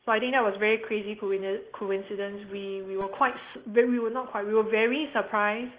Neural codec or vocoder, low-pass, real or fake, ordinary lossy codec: none; 3.6 kHz; real; Opus, 16 kbps